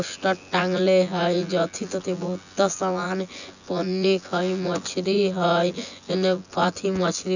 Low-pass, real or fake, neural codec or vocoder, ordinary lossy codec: 7.2 kHz; fake; vocoder, 24 kHz, 100 mel bands, Vocos; none